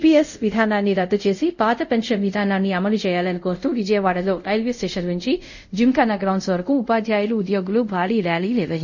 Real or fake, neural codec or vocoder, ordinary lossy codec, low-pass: fake; codec, 24 kHz, 0.5 kbps, DualCodec; none; 7.2 kHz